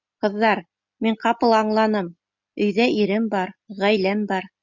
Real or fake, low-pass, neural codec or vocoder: real; 7.2 kHz; none